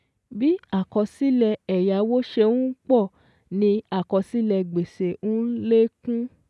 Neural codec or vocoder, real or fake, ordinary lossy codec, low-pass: none; real; none; none